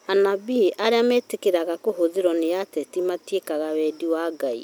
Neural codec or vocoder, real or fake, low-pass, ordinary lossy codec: none; real; none; none